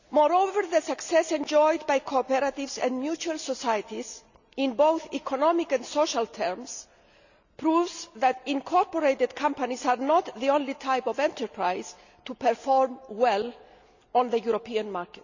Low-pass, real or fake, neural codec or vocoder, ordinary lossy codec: 7.2 kHz; real; none; none